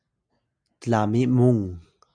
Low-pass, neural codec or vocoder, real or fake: 9.9 kHz; none; real